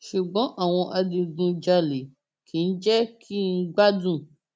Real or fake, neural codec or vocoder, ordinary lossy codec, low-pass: real; none; none; none